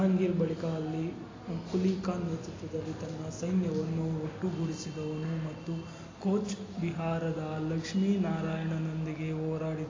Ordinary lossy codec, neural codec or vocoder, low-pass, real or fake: AAC, 32 kbps; none; 7.2 kHz; real